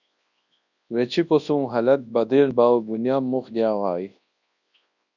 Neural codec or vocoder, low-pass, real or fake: codec, 24 kHz, 0.9 kbps, WavTokenizer, large speech release; 7.2 kHz; fake